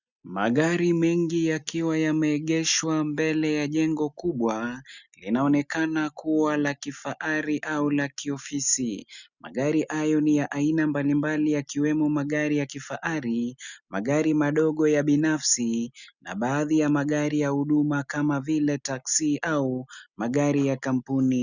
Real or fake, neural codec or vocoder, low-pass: real; none; 7.2 kHz